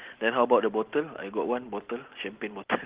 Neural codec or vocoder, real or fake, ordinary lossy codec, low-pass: none; real; Opus, 16 kbps; 3.6 kHz